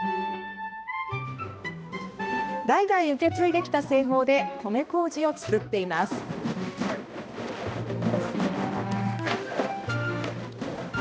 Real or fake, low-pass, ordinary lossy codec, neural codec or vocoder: fake; none; none; codec, 16 kHz, 1 kbps, X-Codec, HuBERT features, trained on balanced general audio